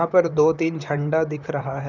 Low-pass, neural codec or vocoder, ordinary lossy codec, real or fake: 7.2 kHz; vocoder, 44.1 kHz, 128 mel bands, Pupu-Vocoder; none; fake